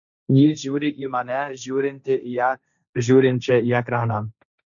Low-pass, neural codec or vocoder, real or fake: 7.2 kHz; codec, 16 kHz, 1.1 kbps, Voila-Tokenizer; fake